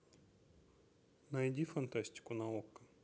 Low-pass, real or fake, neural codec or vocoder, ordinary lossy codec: none; real; none; none